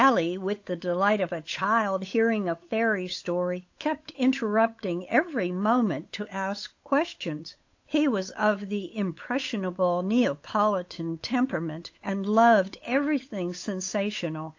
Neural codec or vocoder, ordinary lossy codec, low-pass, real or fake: codec, 16 kHz, 8 kbps, FunCodec, trained on Chinese and English, 25 frames a second; AAC, 48 kbps; 7.2 kHz; fake